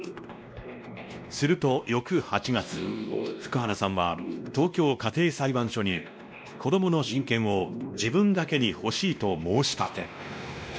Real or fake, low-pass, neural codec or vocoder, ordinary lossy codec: fake; none; codec, 16 kHz, 1 kbps, X-Codec, WavLM features, trained on Multilingual LibriSpeech; none